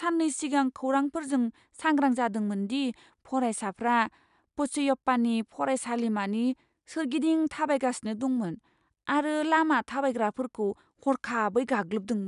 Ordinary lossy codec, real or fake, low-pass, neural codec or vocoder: none; real; 10.8 kHz; none